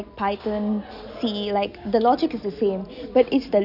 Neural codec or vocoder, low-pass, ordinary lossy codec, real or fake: none; 5.4 kHz; none; real